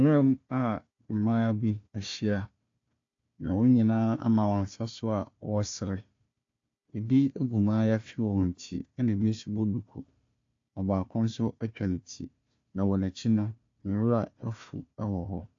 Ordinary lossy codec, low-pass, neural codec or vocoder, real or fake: AAC, 48 kbps; 7.2 kHz; codec, 16 kHz, 1 kbps, FunCodec, trained on Chinese and English, 50 frames a second; fake